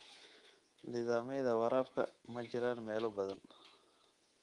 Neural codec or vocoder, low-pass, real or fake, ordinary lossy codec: codec, 24 kHz, 3.1 kbps, DualCodec; 10.8 kHz; fake; Opus, 24 kbps